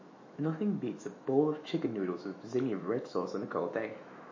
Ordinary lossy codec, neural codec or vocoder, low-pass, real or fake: MP3, 32 kbps; autoencoder, 48 kHz, 128 numbers a frame, DAC-VAE, trained on Japanese speech; 7.2 kHz; fake